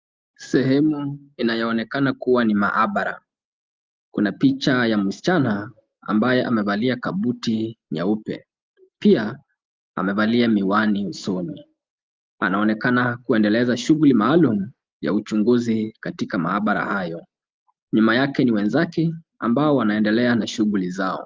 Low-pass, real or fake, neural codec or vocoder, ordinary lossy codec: 7.2 kHz; real; none; Opus, 32 kbps